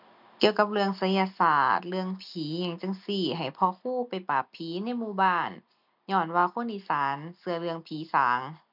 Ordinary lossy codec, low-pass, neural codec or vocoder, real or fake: none; 5.4 kHz; none; real